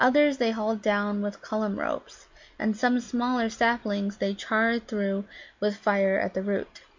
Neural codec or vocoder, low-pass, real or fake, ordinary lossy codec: none; 7.2 kHz; real; MP3, 64 kbps